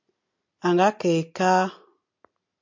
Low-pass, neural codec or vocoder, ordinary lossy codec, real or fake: 7.2 kHz; none; MP3, 48 kbps; real